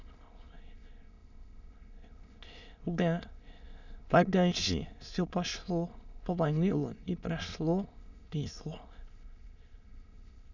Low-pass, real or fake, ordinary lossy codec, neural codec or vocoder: 7.2 kHz; fake; none; autoencoder, 22.05 kHz, a latent of 192 numbers a frame, VITS, trained on many speakers